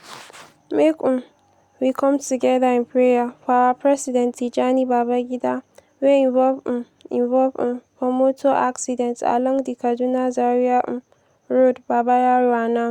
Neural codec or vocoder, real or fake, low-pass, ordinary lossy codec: none; real; 19.8 kHz; Opus, 64 kbps